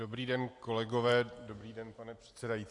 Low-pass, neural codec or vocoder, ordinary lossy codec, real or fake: 10.8 kHz; none; AAC, 48 kbps; real